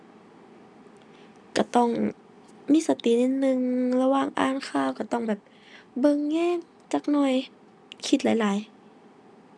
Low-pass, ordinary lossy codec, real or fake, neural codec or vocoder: none; none; real; none